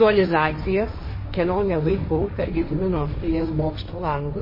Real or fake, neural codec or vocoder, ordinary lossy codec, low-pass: fake; codec, 16 kHz, 1.1 kbps, Voila-Tokenizer; MP3, 24 kbps; 5.4 kHz